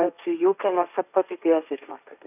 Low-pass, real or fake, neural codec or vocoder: 3.6 kHz; fake; codec, 16 kHz, 1.1 kbps, Voila-Tokenizer